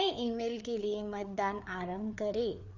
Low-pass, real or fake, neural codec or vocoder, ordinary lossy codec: 7.2 kHz; fake; codec, 16 kHz, 4 kbps, FreqCodec, larger model; Opus, 64 kbps